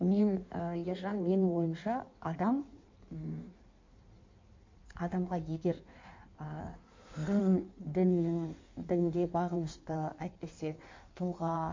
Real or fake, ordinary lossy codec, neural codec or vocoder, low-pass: fake; MP3, 48 kbps; codec, 16 kHz in and 24 kHz out, 1.1 kbps, FireRedTTS-2 codec; 7.2 kHz